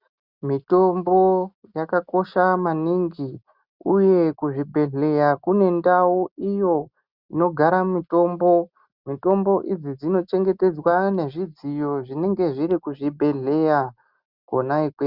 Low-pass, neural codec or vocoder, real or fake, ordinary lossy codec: 5.4 kHz; none; real; Opus, 64 kbps